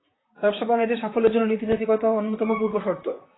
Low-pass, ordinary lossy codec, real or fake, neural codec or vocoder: 7.2 kHz; AAC, 16 kbps; fake; vocoder, 44.1 kHz, 128 mel bands, Pupu-Vocoder